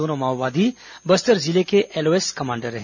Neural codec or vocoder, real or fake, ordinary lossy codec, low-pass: none; real; none; 7.2 kHz